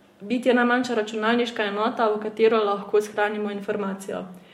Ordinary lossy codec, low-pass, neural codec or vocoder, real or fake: MP3, 64 kbps; 19.8 kHz; none; real